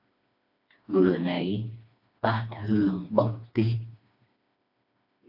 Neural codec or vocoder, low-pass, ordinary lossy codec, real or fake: codec, 16 kHz, 2 kbps, FreqCodec, smaller model; 5.4 kHz; AAC, 48 kbps; fake